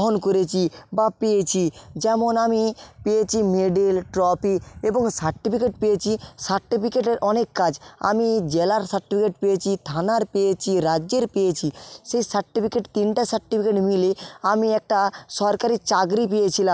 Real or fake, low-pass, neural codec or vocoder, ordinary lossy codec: real; none; none; none